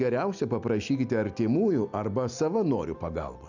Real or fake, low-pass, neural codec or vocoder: real; 7.2 kHz; none